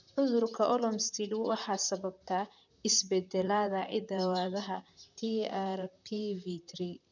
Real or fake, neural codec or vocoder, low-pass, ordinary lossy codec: fake; vocoder, 44.1 kHz, 128 mel bands, Pupu-Vocoder; 7.2 kHz; none